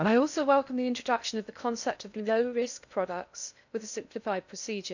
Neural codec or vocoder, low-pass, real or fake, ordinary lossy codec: codec, 16 kHz in and 24 kHz out, 0.6 kbps, FocalCodec, streaming, 2048 codes; 7.2 kHz; fake; none